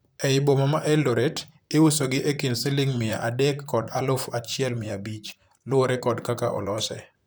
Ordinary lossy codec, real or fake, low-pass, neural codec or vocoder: none; fake; none; vocoder, 44.1 kHz, 128 mel bands, Pupu-Vocoder